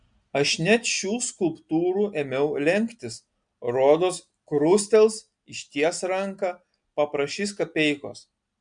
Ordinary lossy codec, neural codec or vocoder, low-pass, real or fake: MP3, 64 kbps; none; 9.9 kHz; real